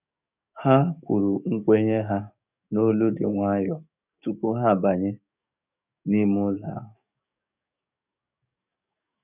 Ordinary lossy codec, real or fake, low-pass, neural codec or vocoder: none; fake; 3.6 kHz; codec, 44.1 kHz, 7.8 kbps, DAC